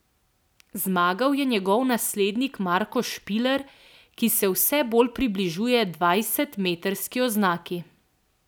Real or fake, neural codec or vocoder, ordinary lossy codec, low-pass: real; none; none; none